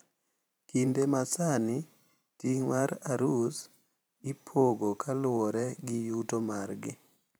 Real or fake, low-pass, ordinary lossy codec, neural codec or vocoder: fake; none; none; vocoder, 44.1 kHz, 128 mel bands every 512 samples, BigVGAN v2